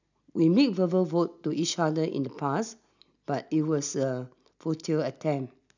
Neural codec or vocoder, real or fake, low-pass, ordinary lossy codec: none; real; 7.2 kHz; none